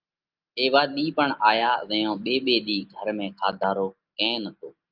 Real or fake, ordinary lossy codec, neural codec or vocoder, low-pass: real; Opus, 24 kbps; none; 5.4 kHz